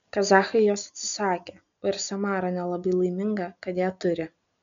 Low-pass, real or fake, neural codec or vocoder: 7.2 kHz; real; none